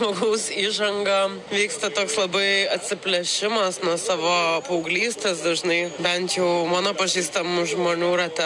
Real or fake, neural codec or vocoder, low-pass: real; none; 10.8 kHz